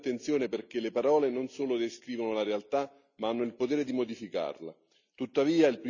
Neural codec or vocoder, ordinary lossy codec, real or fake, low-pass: none; none; real; 7.2 kHz